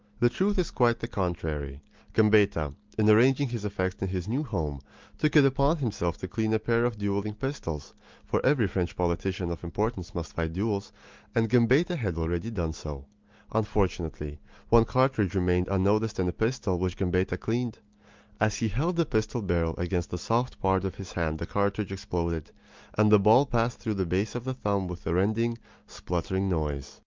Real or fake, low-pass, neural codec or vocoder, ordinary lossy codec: real; 7.2 kHz; none; Opus, 32 kbps